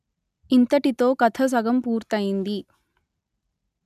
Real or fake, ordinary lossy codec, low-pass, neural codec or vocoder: real; none; 14.4 kHz; none